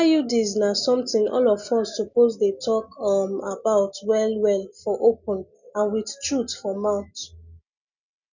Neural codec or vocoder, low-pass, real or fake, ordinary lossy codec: none; 7.2 kHz; real; none